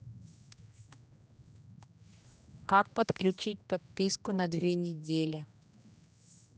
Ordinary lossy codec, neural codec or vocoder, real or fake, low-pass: none; codec, 16 kHz, 1 kbps, X-Codec, HuBERT features, trained on general audio; fake; none